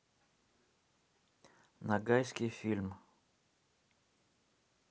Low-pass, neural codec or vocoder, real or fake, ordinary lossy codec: none; none; real; none